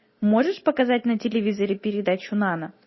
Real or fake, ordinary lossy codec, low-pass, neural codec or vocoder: real; MP3, 24 kbps; 7.2 kHz; none